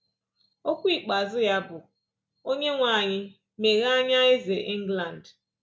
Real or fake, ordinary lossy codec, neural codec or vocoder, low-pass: real; none; none; none